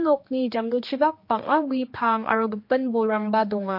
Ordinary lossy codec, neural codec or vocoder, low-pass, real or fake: MP3, 32 kbps; codec, 16 kHz, 2 kbps, X-Codec, HuBERT features, trained on general audio; 5.4 kHz; fake